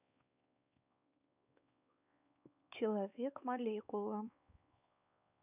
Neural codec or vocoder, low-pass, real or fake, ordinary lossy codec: codec, 16 kHz, 4 kbps, X-Codec, WavLM features, trained on Multilingual LibriSpeech; 3.6 kHz; fake; none